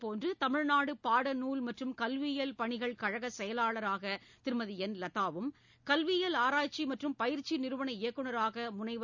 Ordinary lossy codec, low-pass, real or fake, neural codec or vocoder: none; 7.2 kHz; real; none